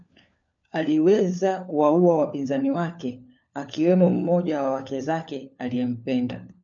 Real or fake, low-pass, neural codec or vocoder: fake; 7.2 kHz; codec, 16 kHz, 4 kbps, FunCodec, trained on LibriTTS, 50 frames a second